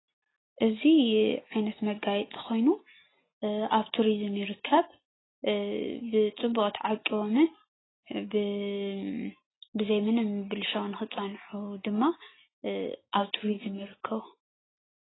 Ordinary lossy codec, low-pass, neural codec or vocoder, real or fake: AAC, 16 kbps; 7.2 kHz; none; real